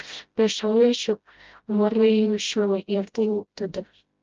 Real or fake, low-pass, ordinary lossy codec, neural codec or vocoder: fake; 7.2 kHz; Opus, 24 kbps; codec, 16 kHz, 0.5 kbps, FreqCodec, smaller model